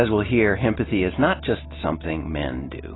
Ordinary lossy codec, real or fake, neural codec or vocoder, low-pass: AAC, 16 kbps; real; none; 7.2 kHz